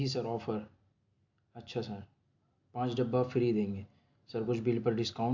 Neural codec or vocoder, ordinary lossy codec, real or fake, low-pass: none; AAC, 48 kbps; real; 7.2 kHz